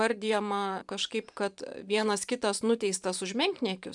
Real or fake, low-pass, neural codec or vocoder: fake; 10.8 kHz; vocoder, 44.1 kHz, 128 mel bands every 512 samples, BigVGAN v2